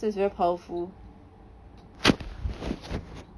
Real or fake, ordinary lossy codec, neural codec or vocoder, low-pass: real; none; none; none